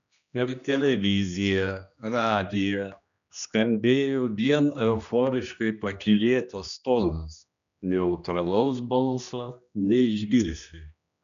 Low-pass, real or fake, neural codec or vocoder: 7.2 kHz; fake; codec, 16 kHz, 1 kbps, X-Codec, HuBERT features, trained on general audio